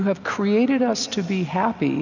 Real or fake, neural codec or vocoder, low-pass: real; none; 7.2 kHz